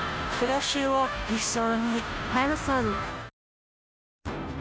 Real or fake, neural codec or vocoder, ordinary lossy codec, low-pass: fake; codec, 16 kHz, 0.5 kbps, FunCodec, trained on Chinese and English, 25 frames a second; none; none